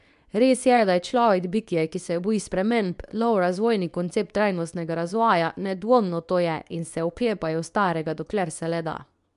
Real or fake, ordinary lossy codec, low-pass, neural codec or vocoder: fake; none; 10.8 kHz; codec, 24 kHz, 0.9 kbps, WavTokenizer, medium speech release version 2